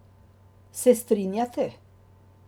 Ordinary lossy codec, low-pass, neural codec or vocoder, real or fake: none; none; none; real